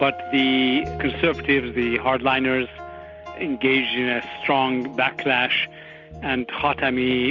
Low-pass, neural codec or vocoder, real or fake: 7.2 kHz; none; real